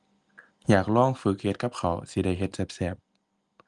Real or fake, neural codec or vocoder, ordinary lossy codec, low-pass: real; none; Opus, 32 kbps; 10.8 kHz